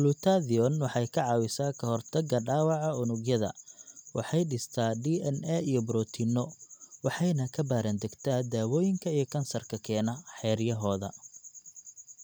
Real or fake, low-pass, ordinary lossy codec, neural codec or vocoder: real; none; none; none